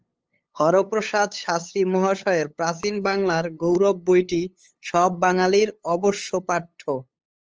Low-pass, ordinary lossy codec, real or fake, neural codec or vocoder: 7.2 kHz; Opus, 24 kbps; fake; codec, 16 kHz, 8 kbps, FunCodec, trained on LibriTTS, 25 frames a second